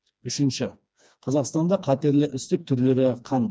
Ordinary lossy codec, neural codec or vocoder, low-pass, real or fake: none; codec, 16 kHz, 2 kbps, FreqCodec, smaller model; none; fake